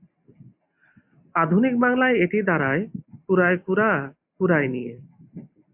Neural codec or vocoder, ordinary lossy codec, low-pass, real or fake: none; AAC, 32 kbps; 3.6 kHz; real